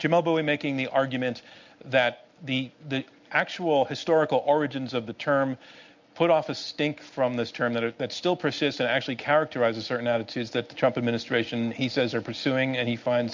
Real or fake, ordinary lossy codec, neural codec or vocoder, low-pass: real; MP3, 64 kbps; none; 7.2 kHz